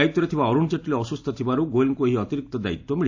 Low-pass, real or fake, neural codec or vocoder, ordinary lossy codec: 7.2 kHz; real; none; AAC, 48 kbps